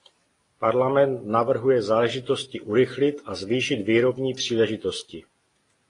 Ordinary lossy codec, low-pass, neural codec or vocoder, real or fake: AAC, 32 kbps; 10.8 kHz; none; real